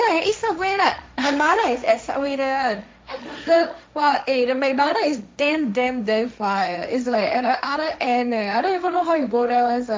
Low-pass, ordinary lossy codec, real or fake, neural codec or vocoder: none; none; fake; codec, 16 kHz, 1.1 kbps, Voila-Tokenizer